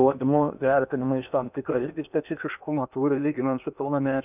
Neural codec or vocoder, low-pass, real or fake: codec, 16 kHz in and 24 kHz out, 0.8 kbps, FocalCodec, streaming, 65536 codes; 3.6 kHz; fake